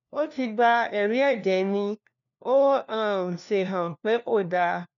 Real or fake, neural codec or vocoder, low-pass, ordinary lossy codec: fake; codec, 16 kHz, 1 kbps, FunCodec, trained on LibriTTS, 50 frames a second; 7.2 kHz; none